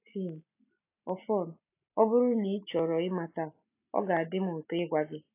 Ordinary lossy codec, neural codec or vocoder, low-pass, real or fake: AAC, 24 kbps; none; 3.6 kHz; real